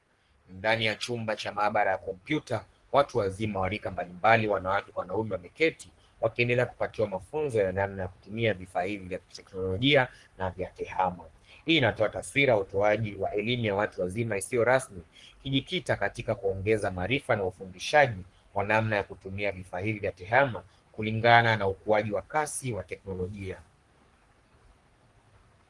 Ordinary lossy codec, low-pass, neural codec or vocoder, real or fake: Opus, 24 kbps; 10.8 kHz; codec, 44.1 kHz, 3.4 kbps, Pupu-Codec; fake